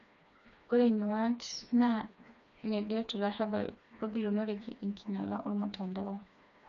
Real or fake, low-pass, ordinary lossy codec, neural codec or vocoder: fake; 7.2 kHz; none; codec, 16 kHz, 2 kbps, FreqCodec, smaller model